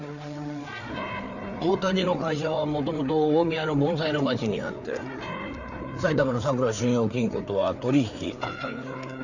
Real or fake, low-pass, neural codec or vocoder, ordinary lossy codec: fake; 7.2 kHz; codec, 16 kHz, 4 kbps, FreqCodec, larger model; none